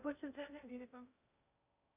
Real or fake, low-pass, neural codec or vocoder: fake; 3.6 kHz; codec, 16 kHz in and 24 kHz out, 0.8 kbps, FocalCodec, streaming, 65536 codes